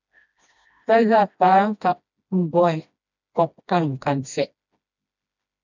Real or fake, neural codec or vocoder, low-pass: fake; codec, 16 kHz, 1 kbps, FreqCodec, smaller model; 7.2 kHz